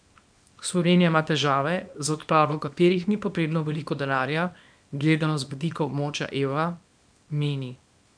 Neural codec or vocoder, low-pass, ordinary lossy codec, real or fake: codec, 24 kHz, 0.9 kbps, WavTokenizer, small release; 9.9 kHz; none; fake